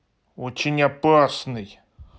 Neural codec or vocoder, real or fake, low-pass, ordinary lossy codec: none; real; none; none